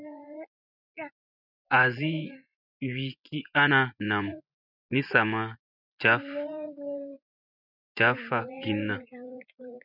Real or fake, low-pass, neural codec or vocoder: fake; 5.4 kHz; vocoder, 24 kHz, 100 mel bands, Vocos